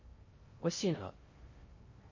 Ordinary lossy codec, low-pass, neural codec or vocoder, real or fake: MP3, 32 kbps; 7.2 kHz; codec, 16 kHz in and 24 kHz out, 0.6 kbps, FocalCodec, streaming, 2048 codes; fake